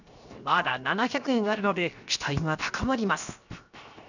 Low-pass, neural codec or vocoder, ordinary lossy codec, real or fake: 7.2 kHz; codec, 16 kHz, 0.7 kbps, FocalCodec; none; fake